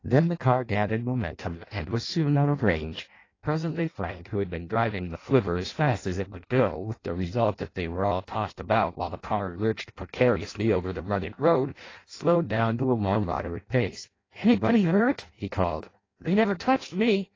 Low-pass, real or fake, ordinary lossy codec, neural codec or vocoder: 7.2 kHz; fake; AAC, 32 kbps; codec, 16 kHz in and 24 kHz out, 0.6 kbps, FireRedTTS-2 codec